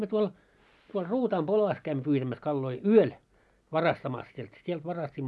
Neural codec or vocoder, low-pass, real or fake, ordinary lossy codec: none; none; real; none